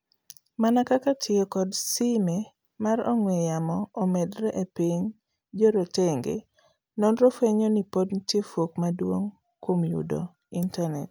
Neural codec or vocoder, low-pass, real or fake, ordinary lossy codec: none; none; real; none